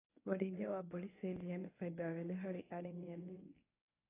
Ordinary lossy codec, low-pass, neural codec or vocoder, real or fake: AAC, 16 kbps; 3.6 kHz; codec, 24 kHz, 0.9 kbps, WavTokenizer, medium speech release version 1; fake